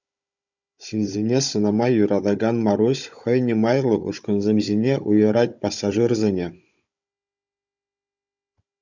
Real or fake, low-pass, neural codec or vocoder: fake; 7.2 kHz; codec, 16 kHz, 16 kbps, FunCodec, trained on Chinese and English, 50 frames a second